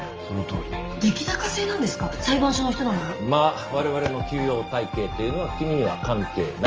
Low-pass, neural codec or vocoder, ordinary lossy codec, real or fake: 7.2 kHz; none; Opus, 24 kbps; real